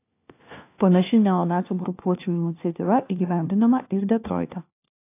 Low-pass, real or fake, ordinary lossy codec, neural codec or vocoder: 3.6 kHz; fake; AAC, 24 kbps; codec, 16 kHz, 1 kbps, FunCodec, trained on LibriTTS, 50 frames a second